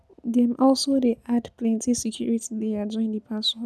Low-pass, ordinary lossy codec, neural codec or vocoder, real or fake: none; none; none; real